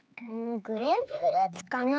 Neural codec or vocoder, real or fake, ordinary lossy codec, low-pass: codec, 16 kHz, 4 kbps, X-Codec, HuBERT features, trained on LibriSpeech; fake; none; none